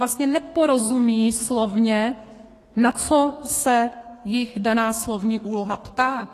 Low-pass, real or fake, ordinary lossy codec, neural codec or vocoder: 14.4 kHz; fake; AAC, 48 kbps; codec, 32 kHz, 1.9 kbps, SNAC